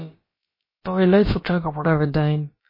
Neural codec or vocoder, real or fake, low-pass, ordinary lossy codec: codec, 16 kHz, about 1 kbps, DyCAST, with the encoder's durations; fake; 5.4 kHz; MP3, 24 kbps